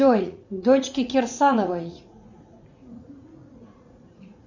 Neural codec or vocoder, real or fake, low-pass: vocoder, 44.1 kHz, 80 mel bands, Vocos; fake; 7.2 kHz